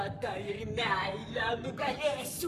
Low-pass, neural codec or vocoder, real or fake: 14.4 kHz; codec, 44.1 kHz, 7.8 kbps, Pupu-Codec; fake